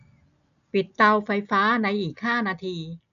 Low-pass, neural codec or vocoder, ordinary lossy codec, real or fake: 7.2 kHz; none; none; real